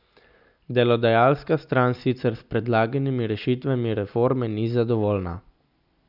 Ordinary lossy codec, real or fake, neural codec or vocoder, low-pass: none; real; none; 5.4 kHz